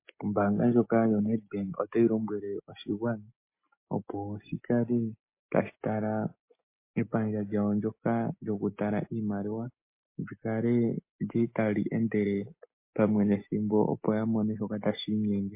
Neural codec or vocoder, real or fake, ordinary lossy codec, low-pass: none; real; MP3, 24 kbps; 3.6 kHz